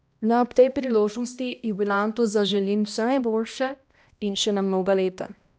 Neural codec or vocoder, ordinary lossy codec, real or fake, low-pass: codec, 16 kHz, 1 kbps, X-Codec, HuBERT features, trained on balanced general audio; none; fake; none